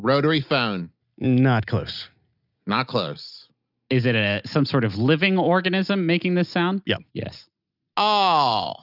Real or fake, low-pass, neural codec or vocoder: real; 5.4 kHz; none